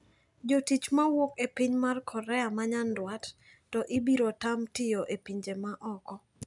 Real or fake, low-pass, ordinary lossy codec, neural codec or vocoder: real; 10.8 kHz; none; none